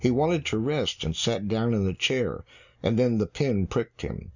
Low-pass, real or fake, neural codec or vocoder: 7.2 kHz; real; none